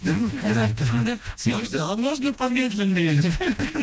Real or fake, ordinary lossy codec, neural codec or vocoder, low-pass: fake; none; codec, 16 kHz, 1 kbps, FreqCodec, smaller model; none